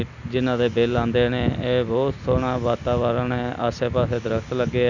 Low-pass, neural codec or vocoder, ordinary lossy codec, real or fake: 7.2 kHz; none; none; real